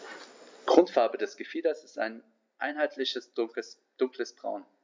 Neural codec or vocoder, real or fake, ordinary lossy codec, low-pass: none; real; MP3, 64 kbps; 7.2 kHz